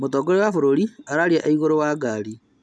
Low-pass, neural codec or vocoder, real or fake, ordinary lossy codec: none; none; real; none